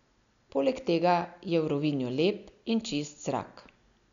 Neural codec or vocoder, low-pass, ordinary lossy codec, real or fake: none; 7.2 kHz; none; real